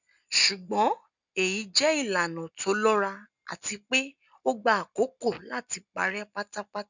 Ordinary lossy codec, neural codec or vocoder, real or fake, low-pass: none; none; real; 7.2 kHz